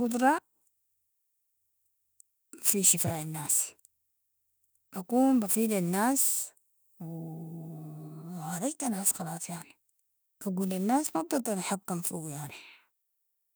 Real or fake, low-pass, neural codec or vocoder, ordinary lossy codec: fake; none; autoencoder, 48 kHz, 32 numbers a frame, DAC-VAE, trained on Japanese speech; none